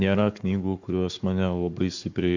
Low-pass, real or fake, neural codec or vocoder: 7.2 kHz; fake; codec, 44.1 kHz, 7.8 kbps, Pupu-Codec